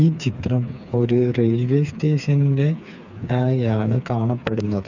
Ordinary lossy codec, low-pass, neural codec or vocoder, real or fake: none; 7.2 kHz; codec, 16 kHz, 4 kbps, FreqCodec, smaller model; fake